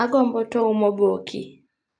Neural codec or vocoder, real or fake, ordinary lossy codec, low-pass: none; real; AAC, 64 kbps; 9.9 kHz